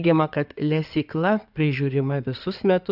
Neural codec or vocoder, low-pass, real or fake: codec, 16 kHz in and 24 kHz out, 2.2 kbps, FireRedTTS-2 codec; 5.4 kHz; fake